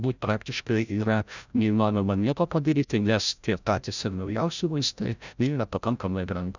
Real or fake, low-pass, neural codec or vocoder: fake; 7.2 kHz; codec, 16 kHz, 0.5 kbps, FreqCodec, larger model